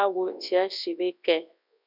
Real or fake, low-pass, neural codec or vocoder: fake; 5.4 kHz; codec, 24 kHz, 0.9 kbps, WavTokenizer, large speech release